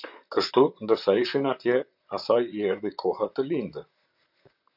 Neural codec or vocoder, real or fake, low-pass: vocoder, 44.1 kHz, 128 mel bands, Pupu-Vocoder; fake; 5.4 kHz